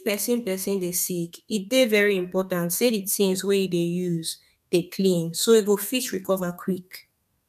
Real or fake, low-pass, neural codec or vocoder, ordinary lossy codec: fake; 14.4 kHz; codec, 32 kHz, 1.9 kbps, SNAC; none